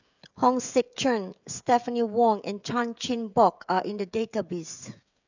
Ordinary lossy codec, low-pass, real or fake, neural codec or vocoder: none; 7.2 kHz; fake; codec, 16 kHz, 16 kbps, FreqCodec, smaller model